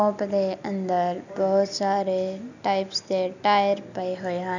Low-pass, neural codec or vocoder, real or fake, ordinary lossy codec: 7.2 kHz; none; real; none